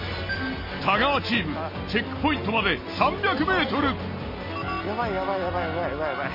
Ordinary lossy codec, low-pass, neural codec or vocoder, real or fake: MP3, 24 kbps; 5.4 kHz; none; real